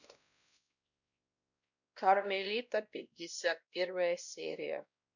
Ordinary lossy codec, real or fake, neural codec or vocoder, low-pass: none; fake; codec, 16 kHz, 1 kbps, X-Codec, WavLM features, trained on Multilingual LibriSpeech; 7.2 kHz